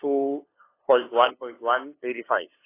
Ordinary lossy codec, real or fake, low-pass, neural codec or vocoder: AAC, 24 kbps; fake; 3.6 kHz; codec, 16 kHz, 2 kbps, FunCodec, trained on LibriTTS, 25 frames a second